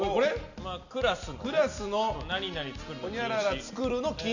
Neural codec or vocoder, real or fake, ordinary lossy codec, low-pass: none; real; none; 7.2 kHz